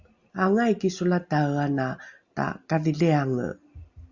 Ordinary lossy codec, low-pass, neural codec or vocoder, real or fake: Opus, 64 kbps; 7.2 kHz; none; real